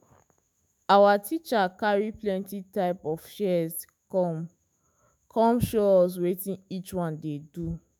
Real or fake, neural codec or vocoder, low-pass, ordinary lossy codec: fake; autoencoder, 48 kHz, 128 numbers a frame, DAC-VAE, trained on Japanese speech; none; none